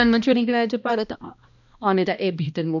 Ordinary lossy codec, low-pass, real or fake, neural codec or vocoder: none; 7.2 kHz; fake; codec, 16 kHz, 1 kbps, X-Codec, HuBERT features, trained on balanced general audio